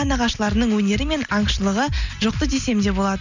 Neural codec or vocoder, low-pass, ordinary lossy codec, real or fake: none; 7.2 kHz; none; real